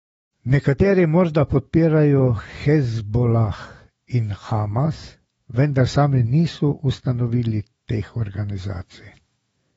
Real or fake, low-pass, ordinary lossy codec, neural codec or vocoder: fake; 19.8 kHz; AAC, 24 kbps; autoencoder, 48 kHz, 128 numbers a frame, DAC-VAE, trained on Japanese speech